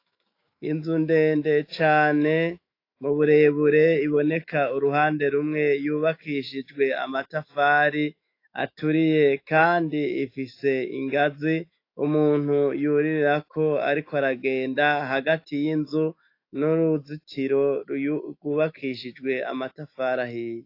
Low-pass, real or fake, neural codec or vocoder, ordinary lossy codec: 5.4 kHz; fake; autoencoder, 48 kHz, 128 numbers a frame, DAC-VAE, trained on Japanese speech; AAC, 32 kbps